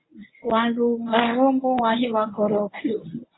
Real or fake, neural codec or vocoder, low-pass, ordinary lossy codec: fake; codec, 24 kHz, 0.9 kbps, WavTokenizer, medium speech release version 1; 7.2 kHz; AAC, 16 kbps